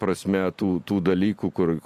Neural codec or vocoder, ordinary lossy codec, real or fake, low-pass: none; MP3, 64 kbps; real; 14.4 kHz